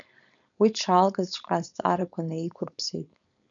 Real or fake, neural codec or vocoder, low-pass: fake; codec, 16 kHz, 4.8 kbps, FACodec; 7.2 kHz